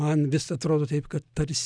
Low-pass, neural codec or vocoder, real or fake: 9.9 kHz; none; real